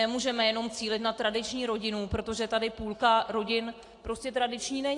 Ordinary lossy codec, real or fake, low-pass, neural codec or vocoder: AAC, 48 kbps; real; 10.8 kHz; none